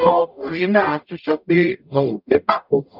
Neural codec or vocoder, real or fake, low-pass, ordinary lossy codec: codec, 44.1 kHz, 0.9 kbps, DAC; fake; 5.4 kHz; none